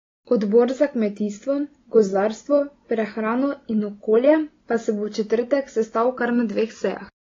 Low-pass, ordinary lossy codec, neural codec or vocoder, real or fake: 7.2 kHz; AAC, 32 kbps; none; real